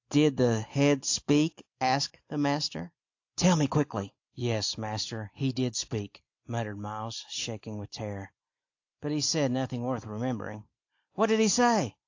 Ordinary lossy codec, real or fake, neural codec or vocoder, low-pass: AAC, 48 kbps; real; none; 7.2 kHz